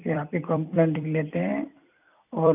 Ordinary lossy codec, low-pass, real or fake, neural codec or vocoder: none; 3.6 kHz; fake; vocoder, 44.1 kHz, 128 mel bands every 256 samples, BigVGAN v2